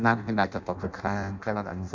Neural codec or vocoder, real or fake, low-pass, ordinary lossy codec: codec, 16 kHz in and 24 kHz out, 0.6 kbps, FireRedTTS-2 codec; fake; 7.2 kHz; MP3, 64 kbps